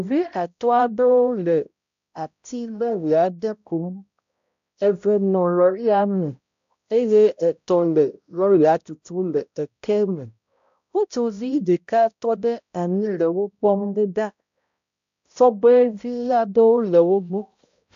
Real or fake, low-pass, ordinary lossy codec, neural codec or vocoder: fake; 7.2 kHz; AAC, 64 kbps; codec, 16 kHz, 0.5 kbps, X-Codec, HuBERT features, trained on balanced general audio